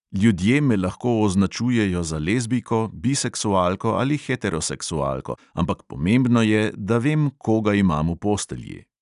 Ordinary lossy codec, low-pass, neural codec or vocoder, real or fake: none; 10.8 kHz; none; real